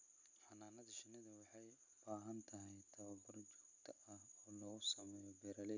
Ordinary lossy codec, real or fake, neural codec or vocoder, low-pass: none; real; none; 7.2 kHz